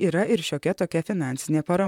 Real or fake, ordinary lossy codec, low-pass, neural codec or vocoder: fake; MP3, 96 kbps; 19.8 kHz; vocoder, 44.1 kHz, 128 mel bands, Pupu-Vocoder